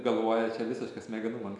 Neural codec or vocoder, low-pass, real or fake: none; 10.8 kHz; real